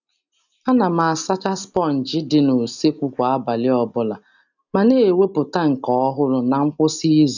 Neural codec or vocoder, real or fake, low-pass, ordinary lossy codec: none; real; 7.2 kHz; none